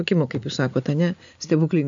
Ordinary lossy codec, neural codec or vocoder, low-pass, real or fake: AAC, 64 kbps; none; 7.2 kHz; real